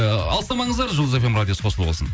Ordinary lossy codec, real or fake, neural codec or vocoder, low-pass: none; real; none; none